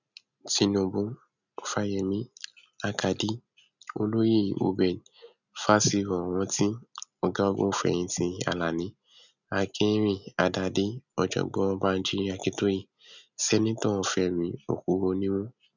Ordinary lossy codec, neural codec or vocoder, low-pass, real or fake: none; none; 7.2 kHz; real